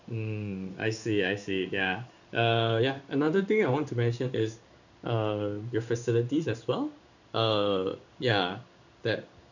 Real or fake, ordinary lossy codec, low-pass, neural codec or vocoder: fake; none; 7.2 kHz; codec, 16 kHz in and 24 kHz out, 1 kbps, XY-Tokenizer